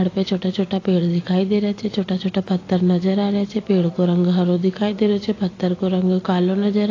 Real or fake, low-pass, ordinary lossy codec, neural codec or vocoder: real; 7.2 kHz; AAC, 32 kbps; none